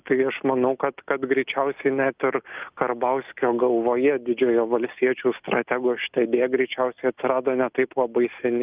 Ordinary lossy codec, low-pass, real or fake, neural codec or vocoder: Opus, 24 kbps; 3.6 kHz; real; none